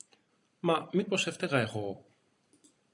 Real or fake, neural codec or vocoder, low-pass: fake; vocoder, 44.1 kHz, 128 mel bands every 256 samples, BigVGAN v2; 10.8 kHz